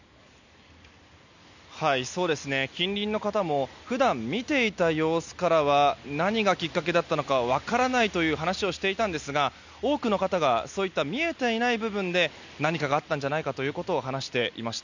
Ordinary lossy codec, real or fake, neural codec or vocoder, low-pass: none; real; none; 7.2 kHz